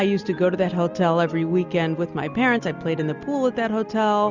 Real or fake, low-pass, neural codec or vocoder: real; 7.2 kHz; none